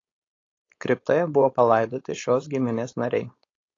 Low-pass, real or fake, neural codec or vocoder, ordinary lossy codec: 7.2 kHz; fake; codec, 16 kHz, 4.8 kbps, FACodec; AAC, 32 kbps